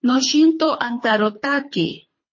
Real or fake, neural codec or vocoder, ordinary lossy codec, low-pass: fake; codec, 24 kHz, 3 kbps, HILCodec; MP3, 32 kbps; 7.2 kHz